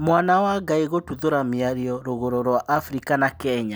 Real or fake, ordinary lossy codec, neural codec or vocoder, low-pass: real; none; none; none